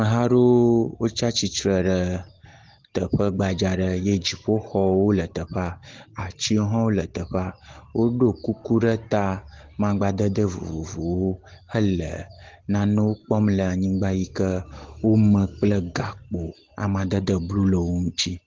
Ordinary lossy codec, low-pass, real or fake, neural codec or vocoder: Opus, 16 kbps; 7.2 kHz; real; none